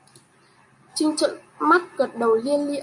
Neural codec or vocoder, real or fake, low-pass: none; real; 10.8 kHz